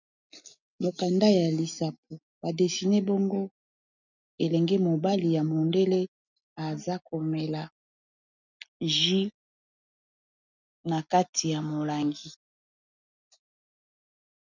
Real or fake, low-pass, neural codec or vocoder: real; 7.2 kHz; none